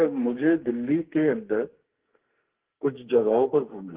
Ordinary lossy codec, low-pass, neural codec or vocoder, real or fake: Opus, 16 kbps; 3.6 kHz; codec, 44.1 kHz, 2.6 kbps, SNAC; fake